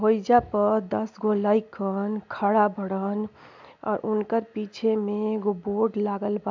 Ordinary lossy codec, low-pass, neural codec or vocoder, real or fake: MP3, 64 kbps; 7.2 kHz; none; real